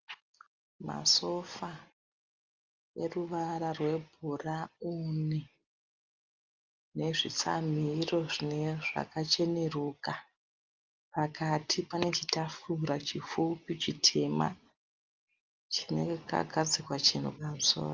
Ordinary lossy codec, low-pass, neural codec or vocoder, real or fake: Opus, 32 kbps; 7.2 kHz; none; real